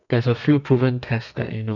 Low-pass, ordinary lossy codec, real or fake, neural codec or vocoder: 7.2 kHz; none; fake; codec, 32 kHz, 1.9 kbps, SNAC